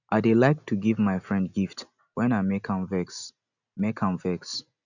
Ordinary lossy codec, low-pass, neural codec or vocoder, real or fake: none; 7.2 kHz; none; real